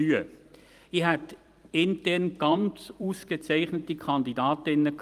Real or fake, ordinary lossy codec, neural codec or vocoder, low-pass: fake; Opus, 32 kbps; vocoder, 44.1 kHz, 128 mel bands every 512 samples, BigVGAN v2; 14.4 kHz